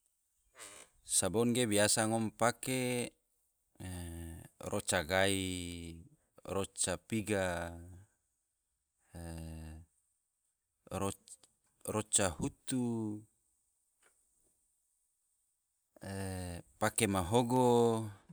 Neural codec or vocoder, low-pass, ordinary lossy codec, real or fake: none; none; none; real